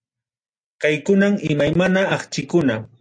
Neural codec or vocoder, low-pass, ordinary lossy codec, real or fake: none; 9.9 kHz; AAC, 64 kbps; real